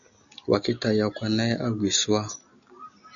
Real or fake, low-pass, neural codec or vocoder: real; 7.2 kHz; none